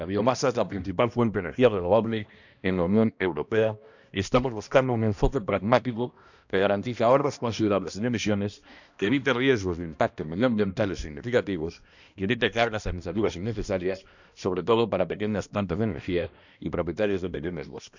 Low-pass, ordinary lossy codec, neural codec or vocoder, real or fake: 7.2 kHz; none; codec, 16 kHz, 1 kbps, X-Codec, HuBERT features, trained on balanced general audio; fake